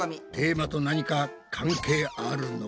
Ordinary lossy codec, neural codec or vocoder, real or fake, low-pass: none; none; real; none